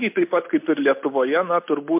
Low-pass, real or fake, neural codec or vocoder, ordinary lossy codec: 3.6 kHz; real; none; MP3, 32 kbps